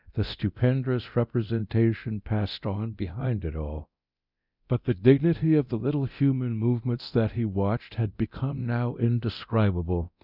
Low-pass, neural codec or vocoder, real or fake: 5.4 kHz; codec, 24 kHz, 0.9 kbps, DualCodec; fake